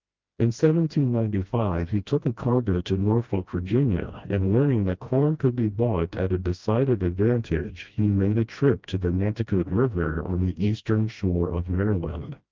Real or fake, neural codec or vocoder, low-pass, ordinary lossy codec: fake; codec, 16 kHz, 1 kbps, FreqCodec, smaller model; 7.2 kHz; Opus, 24 kbps